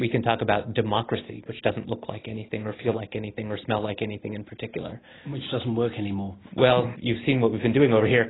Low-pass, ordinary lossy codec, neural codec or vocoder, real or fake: 7.2 kHz; AAC, 16 kbps; none; real